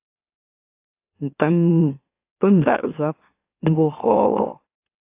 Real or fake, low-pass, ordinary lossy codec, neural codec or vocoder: fake; 3.6 kHz; AAC, 24 kbps; autoencoder, 44.1 kHz, a latent of 192 numbers a frame, MeloTTS